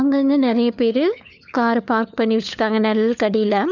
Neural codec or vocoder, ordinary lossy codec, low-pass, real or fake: codec, 16 kHz, 4 kbps, FunCodec, trained on LibriTTS, 50 frames a second; none; 7.2 kHz; fake